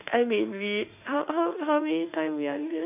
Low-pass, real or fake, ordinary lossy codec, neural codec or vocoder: 3.6 kHz; fake; none; autoencoder, 48 kHz, 32 numbers a frame, DAC-VAE, trained on Japanese speech